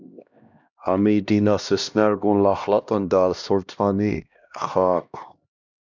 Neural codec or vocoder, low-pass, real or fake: codec, 16 kHz, 1 kbps, X-Codec, HuBERT features, trained on LibriSpeech; 7.2 kHz; fake